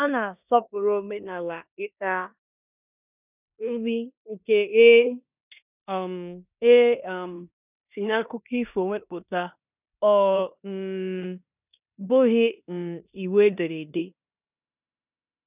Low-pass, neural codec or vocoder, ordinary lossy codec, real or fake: 3.6 kHz; codec, 16 kHz in and 24 kHz out, 0.9 kbps, LongCat-Audio-Codec, four codebook decoder; none; fake